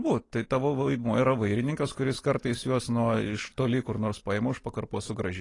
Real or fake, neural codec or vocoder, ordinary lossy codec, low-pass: real; none; AAC, 32 kbps; 10.8 kHz